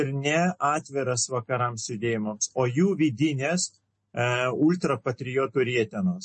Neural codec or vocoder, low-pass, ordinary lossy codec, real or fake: none; 10.8 kHz; MP3, 32 kbps; real